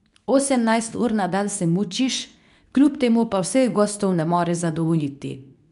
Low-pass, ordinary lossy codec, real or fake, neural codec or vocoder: 10.8 kHz; none; fake; codec, 24 kHz, 0.9 kbps, WavTokenizer, medium speech release version 2